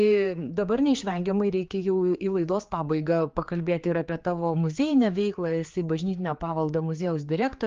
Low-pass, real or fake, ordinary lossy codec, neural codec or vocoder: 7.2 kHz; fake; Opus, 32 kbps; codec, 16 kHz, 4 kbps, X-Codec, HuBERT features, trained on general audio